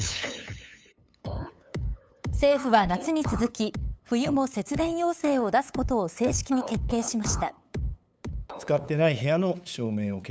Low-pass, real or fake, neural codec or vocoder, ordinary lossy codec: none; fake; codec, 16 kHz, 8 kbps, FunCodec, trained on LibriTTS, 25 frames a second; none